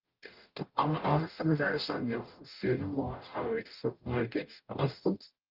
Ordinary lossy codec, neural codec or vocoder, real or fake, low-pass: Opus, 32 kbps; codec, 44.1 kHz, 0.9 kbps, DAC; fake; 5.4 kHz